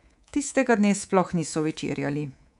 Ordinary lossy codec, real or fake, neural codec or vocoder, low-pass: none; fake; codec, 24 kHz, 3.1 kbps, DualCodec; 10.8 kHz